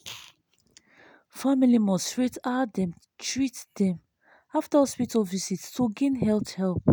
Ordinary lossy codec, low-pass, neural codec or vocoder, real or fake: none; none; none; real